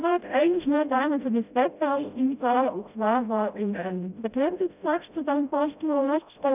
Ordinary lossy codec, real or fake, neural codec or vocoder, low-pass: none; fake; codec, 16 kHz, 0.5 kbps, FreqCodec, smaller model; 3.6 kHz